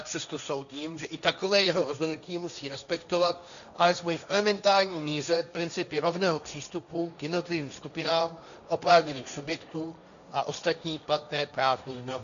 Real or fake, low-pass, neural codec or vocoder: fake; 7.2 kHz; codec, 16 kHz, 1.1 kbps, Voila-Tokenizer